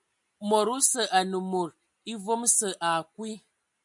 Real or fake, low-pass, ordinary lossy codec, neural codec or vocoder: real; 10.8 kHz; MP3, 96 kbps; none